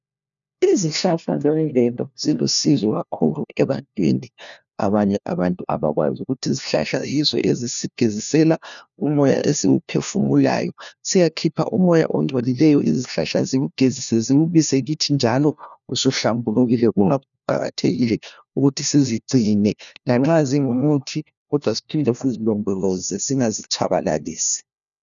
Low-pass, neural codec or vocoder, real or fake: 7.2 kHz; codec, 16 kHz, 1 kbps, FunCodec, trained on LibriTTS, 50 frames a second; fake